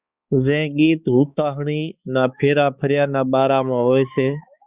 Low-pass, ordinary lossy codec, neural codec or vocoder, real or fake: 3.6 kHz; Opus, 64 kbps; codec, 16 kHz, 4 kbps, X-Codec, HuBERT features, trained on balanced general audio; fake